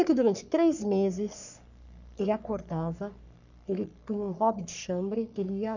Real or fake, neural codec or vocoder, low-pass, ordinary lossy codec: fake; codec, 44.1 kHz, 3.4 kbps, Pupu-Codec; 7.2 kHz; none